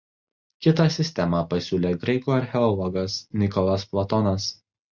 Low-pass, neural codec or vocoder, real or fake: 7.2 kHz; none; real